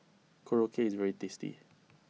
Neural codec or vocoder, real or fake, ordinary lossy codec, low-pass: none; real; none; none